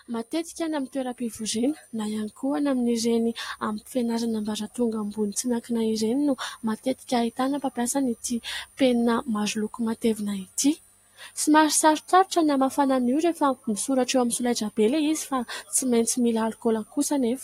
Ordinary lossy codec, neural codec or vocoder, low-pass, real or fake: AAC, 48 kbps; none; 19.8 kHz; real